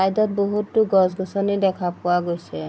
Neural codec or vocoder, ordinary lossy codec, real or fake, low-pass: none; none; real; none